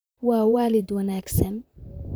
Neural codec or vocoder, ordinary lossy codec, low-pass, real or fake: vocoder, 44.1 kHz, 128 mel bands, Pupu-Vocoder; none; none; fake